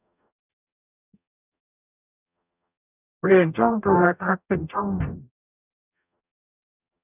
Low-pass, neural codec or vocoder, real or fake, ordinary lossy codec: 3.6 kHz; codec, 44.1 kHz, 0.9 kbps, DAC; fake; none